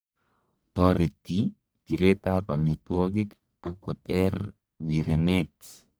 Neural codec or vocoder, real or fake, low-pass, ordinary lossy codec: codec, 44.1 kHz, 1.7 kbps, Pupu-Codec; fake; none; none